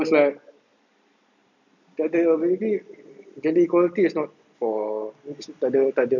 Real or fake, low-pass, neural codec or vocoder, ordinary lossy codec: real; 7.2 kHz; none; none